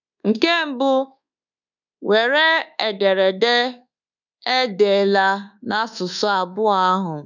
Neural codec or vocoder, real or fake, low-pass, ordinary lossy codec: codec, 24 kHz, 1.2 kbps, DualCodec; fake; 7.2 kHz; none